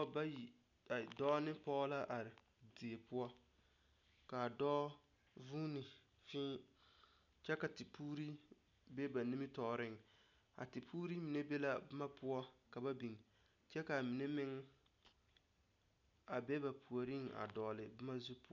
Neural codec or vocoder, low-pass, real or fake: none; 7.2 kHz; real